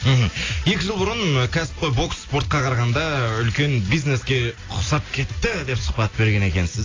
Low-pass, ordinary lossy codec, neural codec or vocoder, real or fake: 7.2 kHz; AAC, 32 kbps; none; real